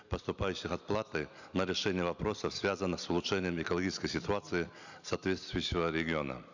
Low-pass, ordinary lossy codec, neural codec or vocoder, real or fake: 7.2 kHz; none; none; real